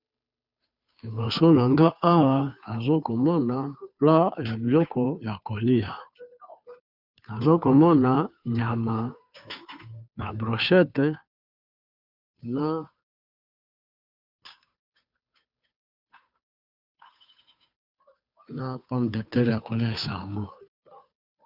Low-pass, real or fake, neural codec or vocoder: 5.4 kHz; fake; codec, 16 kHz, 2 kbps, FunCodec, trained on Chinese and English, 25 frames a second